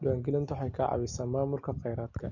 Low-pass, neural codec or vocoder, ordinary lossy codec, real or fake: 7.2 kHz; none; none; real